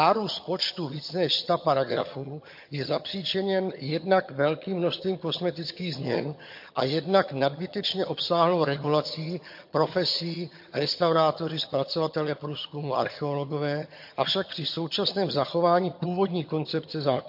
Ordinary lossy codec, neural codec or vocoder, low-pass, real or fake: MP3, 32 kbps; vocoder, 22.05 kHz, 80 mel bands, HiFi-GAN; 5.4 kHz; fake